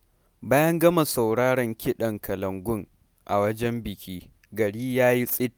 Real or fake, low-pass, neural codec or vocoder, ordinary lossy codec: real; none; none; none